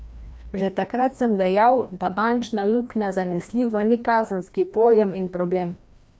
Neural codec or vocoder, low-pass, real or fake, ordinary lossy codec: codec, 16 kHz, 1 kbps, FreqCodec, larger model; none; fake; none